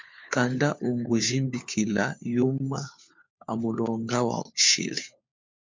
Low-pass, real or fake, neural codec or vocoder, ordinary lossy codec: 7.2 kHz; fake; codec, 16 kHz, 4 kbps, FunCodec, trained on LibriTTS, 50 frames a second; MP3, 64 kbps